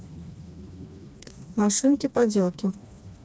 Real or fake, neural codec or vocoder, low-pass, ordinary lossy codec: fake; codec, 16 kHz, 2 kbps, FreqCodec, smaller model; none; none